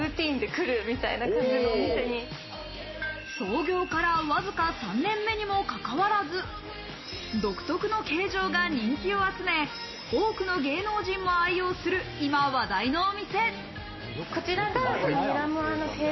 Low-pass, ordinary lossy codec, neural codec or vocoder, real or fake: 7.2 kHz; MP3, 24 kbps; none; real